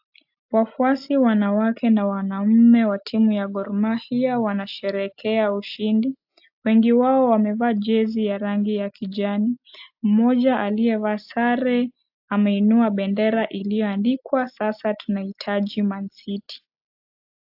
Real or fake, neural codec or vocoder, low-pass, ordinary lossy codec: real; none; 5.4 kHz; AAC, 48 kbps